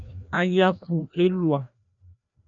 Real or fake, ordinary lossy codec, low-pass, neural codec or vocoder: fake; MP3, 96 kbps; 7.2 kHz; codec, 16 kHz, 1 kbps, FreqCodec, larger model